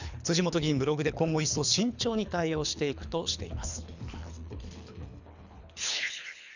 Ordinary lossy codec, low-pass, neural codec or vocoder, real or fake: none; 7.2 kHz; codec, 24 kHz, 3 kbps, HILCodec; fake